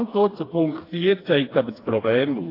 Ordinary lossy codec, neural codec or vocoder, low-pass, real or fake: AAC, 24 kbps; codec, 16 kHz, 2 kbps, FreqCodec, smaller model; 5.4 kHz; fake